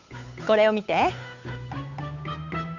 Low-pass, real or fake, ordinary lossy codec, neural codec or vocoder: 7.2 kHz; fake; none; codec, 16 kHz, 8 kbps, FunCodec, trained on Chinese and English, 25 frames a second